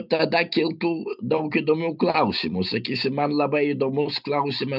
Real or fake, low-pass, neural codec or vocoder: real; 5.4 kHz; none